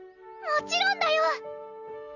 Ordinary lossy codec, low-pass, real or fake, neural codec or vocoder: none; 7.2 kHz; real; none